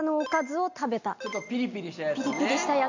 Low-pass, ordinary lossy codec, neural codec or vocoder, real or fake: 7.2 kHz; AAC, 48 kbps; none; real